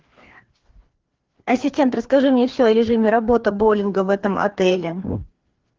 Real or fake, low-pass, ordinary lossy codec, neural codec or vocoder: fake; 7.2 kHz; Opus, 16 kbps; codec, 16 kHz, 2 kbps, FreqCodec, larger model